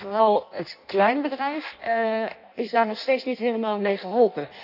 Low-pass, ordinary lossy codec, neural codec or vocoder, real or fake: 5.4 kHz; none; codec, 16 kHz in and 24 kHz out, 0.6 kbps, FireRedTTS-2 codec; fake